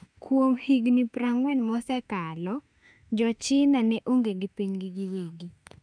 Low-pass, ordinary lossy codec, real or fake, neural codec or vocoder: 9.9 kHz; none; fake; autoencoder, 48 kHz, 32 numbers a frame, DAC-VAE, trained on Japanese speech